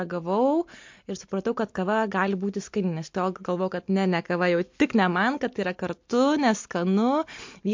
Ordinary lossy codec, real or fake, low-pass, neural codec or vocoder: MP3, 48 kbps; real; 7.2 kHz; none